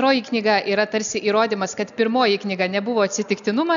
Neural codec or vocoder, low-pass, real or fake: none; 7.2 kHz; real